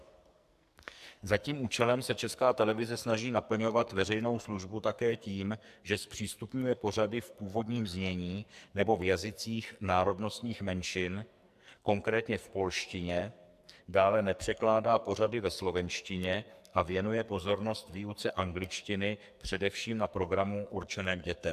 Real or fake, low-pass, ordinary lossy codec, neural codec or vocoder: fake; 14.4 kHz; AAC, 96 kbps; codec, 44.1 kHz, 2.6 kbps, SNAC